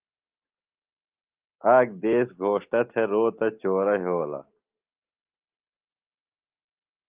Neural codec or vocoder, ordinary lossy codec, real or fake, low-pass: none; Opus, 32 kbps; real; 3.6 kHz